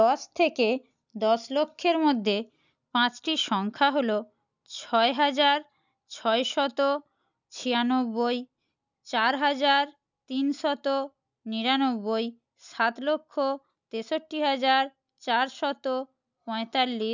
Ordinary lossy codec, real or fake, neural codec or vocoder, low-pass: none; real; none; 7.2 kHz